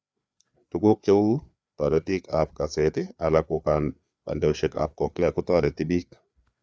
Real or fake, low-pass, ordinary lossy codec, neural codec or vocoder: fake; none; none; codec, 16 kHz, 4 kbps, FreqCodec, larger model